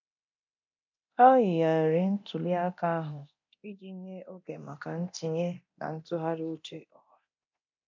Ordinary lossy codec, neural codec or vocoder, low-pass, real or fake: MP3, 48 kbps; codec, 24 kHz, 0.9 kbps, DualCodec; 7.2 kHz; fake